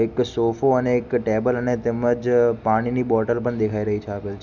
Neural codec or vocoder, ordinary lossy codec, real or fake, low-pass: none; none; real; 7.2 kHz